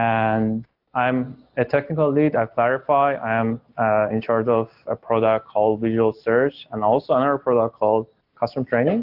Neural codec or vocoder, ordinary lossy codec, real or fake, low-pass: none; AAC, 48 kbps; real; 5.4 kHz